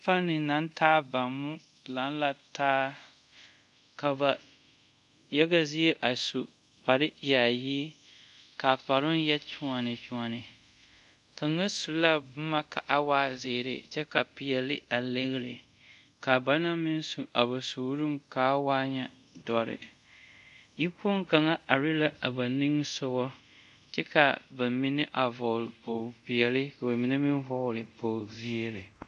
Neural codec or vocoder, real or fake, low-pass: codec, 24 kHz, 0.5 kbps, DualCodec; fake; 10.8 kHz